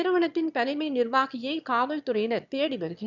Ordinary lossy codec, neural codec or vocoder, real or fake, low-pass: none; autoencoder, 22.05 kHz, a latent of 192 numbers a frame, VITS, trained on one speaker; fake; 7.2 kHz